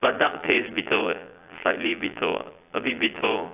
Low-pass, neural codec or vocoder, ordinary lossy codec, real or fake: 3.6 kHz; vocoder, 22.05 kHz, 80 mel bands, Vocos; AAC, 32 kbps; fake